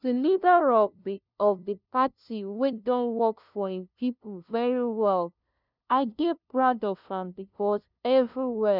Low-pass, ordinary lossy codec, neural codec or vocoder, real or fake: 5.4 kHz; none; codec, 16 kHz, 0.5 kbps, FunCodec, trained on LibriTTS, 25 frames a second; fake